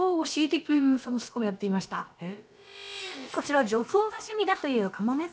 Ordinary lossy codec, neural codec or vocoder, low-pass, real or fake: none; codec, 16 kHz, about 1 kbps, DyCAST, with the encoder's durations; none; fake